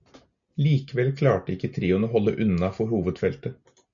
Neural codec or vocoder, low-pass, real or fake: none; 7.2 kHz; real